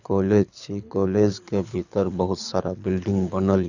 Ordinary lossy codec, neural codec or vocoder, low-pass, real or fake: none; codec, 24 kHz, 6 kbps, HILCodec; 7.2 kHz; fake